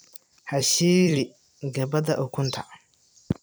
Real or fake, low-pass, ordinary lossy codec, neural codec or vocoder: fake; none; none; vocoder, 44.1 kHz, 128 mel bands every 256 samples, BigVGAN v2